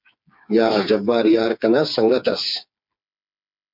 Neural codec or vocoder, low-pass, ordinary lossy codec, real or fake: codec, 16 kHz, 4 kbps, FreqCodec, smaller model; 5.4 kHz; MP3, 48 kbps; fake